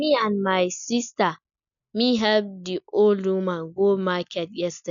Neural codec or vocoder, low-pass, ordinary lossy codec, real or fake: none; 7.2 kHz; none; real